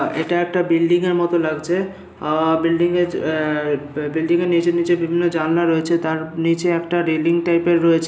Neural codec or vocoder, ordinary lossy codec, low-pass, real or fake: none; none; none; real